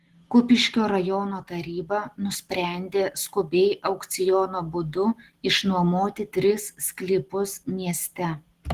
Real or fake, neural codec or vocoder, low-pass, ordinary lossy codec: real; none; 14.4 kHz; Opus, 16 kbps